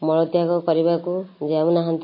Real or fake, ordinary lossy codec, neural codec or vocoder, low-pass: real; MP3, 24 kbps; none; 5.4 kHz